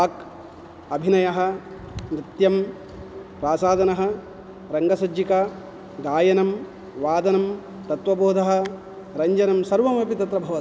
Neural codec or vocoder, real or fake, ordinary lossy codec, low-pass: none; real; none; none